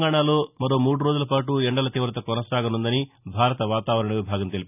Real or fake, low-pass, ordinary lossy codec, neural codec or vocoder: real; 3.6 kHz; none; none